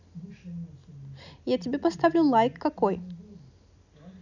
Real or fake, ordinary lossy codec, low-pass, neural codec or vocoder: real; none; 7.2 kHz; none